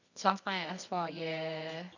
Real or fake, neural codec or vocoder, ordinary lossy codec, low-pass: fake; codec, 24 kHz, 0.9 kbps, WavTokenizer, medium music audio release; none; 7.2 kHz